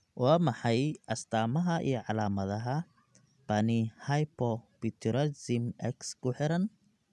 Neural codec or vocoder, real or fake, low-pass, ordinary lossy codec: none; real; none; none